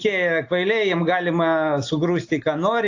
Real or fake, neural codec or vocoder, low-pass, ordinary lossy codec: real; none; 7.2 kHz; AAC, 48 kbps